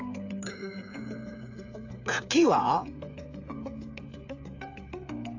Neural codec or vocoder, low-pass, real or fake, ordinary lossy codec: codec, 16 kHz, 8 kbps, FreqCodec, smaller model; 7.2 kHz; fake; AAC, 48 kbps